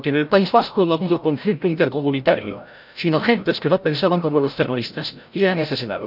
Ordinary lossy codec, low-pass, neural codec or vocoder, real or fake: none; 5.4 kHz; codec, 16 kHz, 0.5 kbps, FreqCodec, larger model; fake